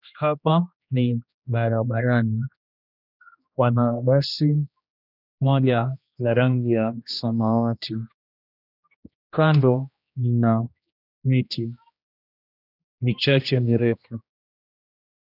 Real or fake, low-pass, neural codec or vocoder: fake; 5.4 kHz; codec, 16 kHz, 1 kbps, X-Codec, HuBERT features, trained on general audio